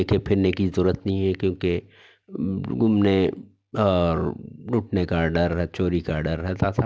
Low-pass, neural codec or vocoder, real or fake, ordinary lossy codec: none; none; real; none